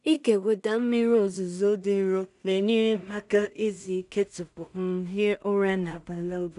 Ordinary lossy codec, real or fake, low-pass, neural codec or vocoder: none; fake; 10.8 kHz; codec, 16 kHz in and 24 kHz out, 0.4 kbps, LongCat-Audio-Codec, two codebook decoder